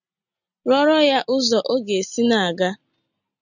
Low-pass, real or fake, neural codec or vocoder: 7.2 kHz; real; none